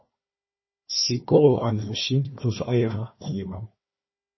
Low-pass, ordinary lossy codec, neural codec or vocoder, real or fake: 7.2 kHz; MP3, 24 kbps; codec, 16 kHz, 1 kbps, FunCodec, trained on Chinese and English, 50 frames a second; fake